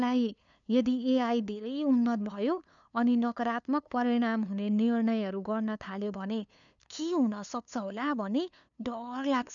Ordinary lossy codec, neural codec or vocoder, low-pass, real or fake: none; codec, 16 kHz, 2 kbps, FunCodec, trained on LibriTTS, 25 frames a second; 7.2 kHz; fake